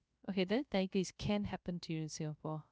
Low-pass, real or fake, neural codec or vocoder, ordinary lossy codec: none; fake; codec, 16 kHz, 0.3 kbps, FocalCodec; none